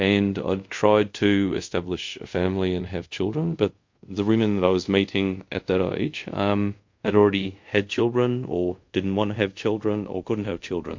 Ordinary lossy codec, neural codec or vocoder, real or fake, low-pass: MP3, 48 kbps; codec, 24 kHz, 0.5 kbps, DualCodec; fake; 7.2 kHz